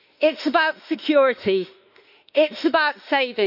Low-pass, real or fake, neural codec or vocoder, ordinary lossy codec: 5.4 kHz; fake; autoencoder, 48 kHz, 32 numbers a frame, DAC-VAE, trained on Japanese speech; none